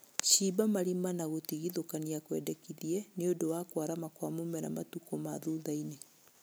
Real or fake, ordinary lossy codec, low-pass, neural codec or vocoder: real; none; none; none